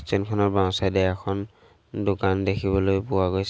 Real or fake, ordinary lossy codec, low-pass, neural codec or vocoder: real; none; none; none